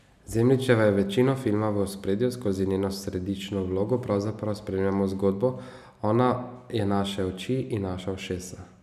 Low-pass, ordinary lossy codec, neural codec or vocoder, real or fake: 14.4 kHz; none; none; real